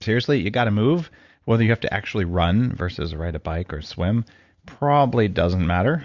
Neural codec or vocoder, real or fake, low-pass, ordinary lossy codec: none; real; 7.2 kHz; Opus, 64 kbps